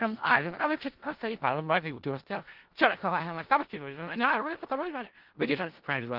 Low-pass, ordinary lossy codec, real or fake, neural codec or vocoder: 5.4 kHz; Opus, 16 kbps; fake; codec, 16 kHz in and 24 kHz out, 0.4 kbps, LongCat-Audio-Codec, four codebook decoder